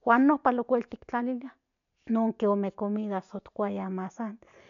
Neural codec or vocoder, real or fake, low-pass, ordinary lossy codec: none; real; 7.2 kHz; none